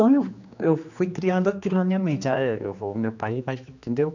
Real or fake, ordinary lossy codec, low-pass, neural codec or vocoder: fake; none; 7.2 kHz; codec, 16 kHz, 2 kbps, X-Codec, HuBERT features, trained on general audio